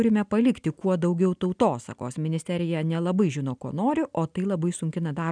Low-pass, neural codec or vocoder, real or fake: 9.9 kHz; none; real